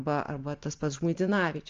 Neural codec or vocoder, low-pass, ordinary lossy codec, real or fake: none; 7.2 kHz; Opus, 32 kbps; real